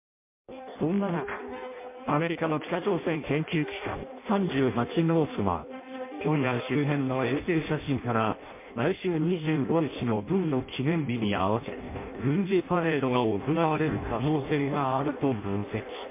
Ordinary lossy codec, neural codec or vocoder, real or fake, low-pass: MP3, 24 kbps; codec, 16 kHz in and 24 kHz out, 0.6 kbps, FireRedTTS-2 codec; fake; 3.6 kHz